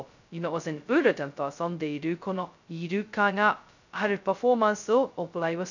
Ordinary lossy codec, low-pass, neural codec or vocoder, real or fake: none; 7.2 kHz; codec, 16 kHz, 0.2 kbps, FocalCodec; fake